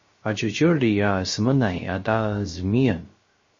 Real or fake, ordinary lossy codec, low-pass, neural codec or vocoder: fake; MP3, 32 kbps; 7.2 kHz; codec, 16 kHz, 0.3 kbps, FocalCodec